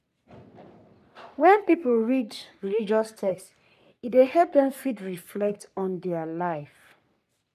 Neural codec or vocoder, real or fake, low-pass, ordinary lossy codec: codec, 44.1 kHz, 3.4 kbps, Pupu-Codec; fake; 14.4 kHz; none